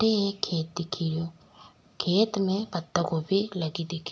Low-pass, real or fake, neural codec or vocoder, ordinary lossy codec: none; real; none; none